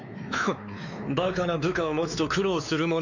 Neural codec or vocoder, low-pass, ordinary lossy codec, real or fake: codec, 16 kHz, 4 kbps, X-Codec, WavLM features, trained on Multilingual LibriSpeech; 7.2 kHz; none; fake